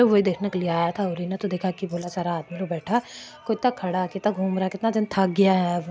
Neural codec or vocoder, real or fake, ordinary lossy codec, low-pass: none; real; none; none